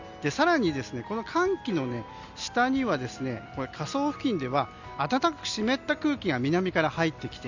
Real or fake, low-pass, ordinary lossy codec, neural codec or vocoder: real; 7.2 kHz; Opus, 64 kbps; none